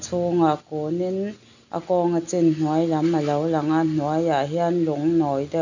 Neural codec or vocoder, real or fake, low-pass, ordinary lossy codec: none; real; 7.2 kHz; none